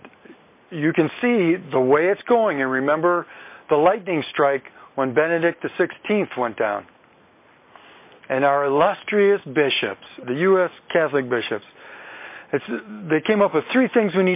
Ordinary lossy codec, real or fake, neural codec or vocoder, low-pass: MP3, 24 kbps; real; none; 3.6 kHz